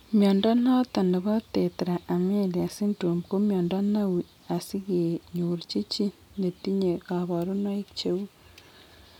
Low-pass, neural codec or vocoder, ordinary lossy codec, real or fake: 19.8 kHz; none; none; real